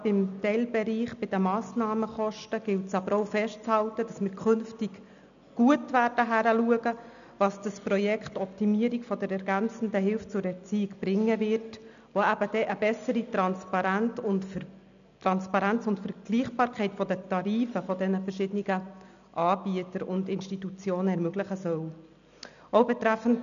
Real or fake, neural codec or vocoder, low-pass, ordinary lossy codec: real; none; 7.2 kHz; none